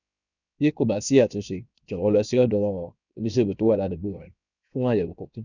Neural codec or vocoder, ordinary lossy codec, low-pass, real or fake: codec, 16 kHz, 0.7 kbps, FocalCodec; none; 7.2 kHz; fake